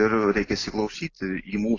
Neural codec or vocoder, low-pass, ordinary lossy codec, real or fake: none; 7.2 kHz; AAC, 32 kbps; real